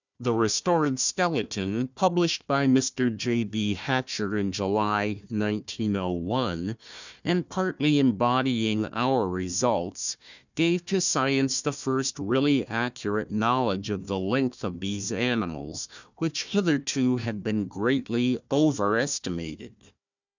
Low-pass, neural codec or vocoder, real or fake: 7.2 kHz; codec, 16 kHz, 1 kbps, FunCodec, trained on Chinese and English, 50 frames a second; fake